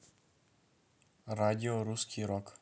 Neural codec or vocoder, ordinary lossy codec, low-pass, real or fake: none; none; none; real